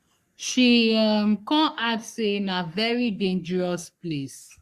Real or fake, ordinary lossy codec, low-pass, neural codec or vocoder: fake; Opus, 64 kbps; 14.4 kHz; codec, 44.1 kHz, 3.4 kbps, Pupu-Codec